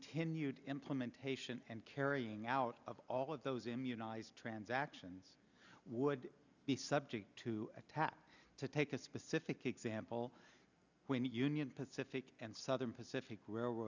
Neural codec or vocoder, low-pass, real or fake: none; 7.2 kHz; real